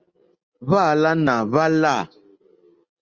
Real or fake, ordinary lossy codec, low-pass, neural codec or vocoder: real; Opus, 32 kbps; 7.2 kHz; none